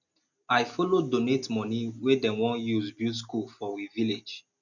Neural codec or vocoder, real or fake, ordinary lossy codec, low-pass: none; real; none; 7.2 kHz